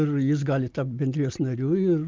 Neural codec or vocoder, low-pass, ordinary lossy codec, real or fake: none; 7.2 kHz; Opus, 24 kbps; real